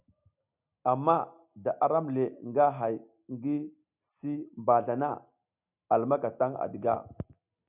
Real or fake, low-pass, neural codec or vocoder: real; 3.6 kHz; none